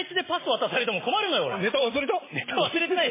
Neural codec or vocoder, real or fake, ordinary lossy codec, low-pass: none; real; MP3, 16 kbps; 3.6 kHz